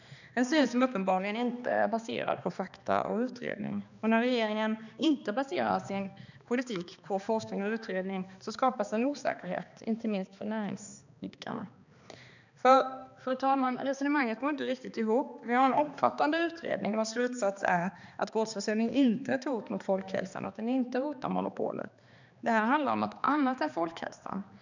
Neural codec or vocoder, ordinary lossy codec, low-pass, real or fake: codec, 16 kHz, 2 kbps, X-Codec, HuBERT features, trained on balanced general audio; none; 7.2 kHz; fake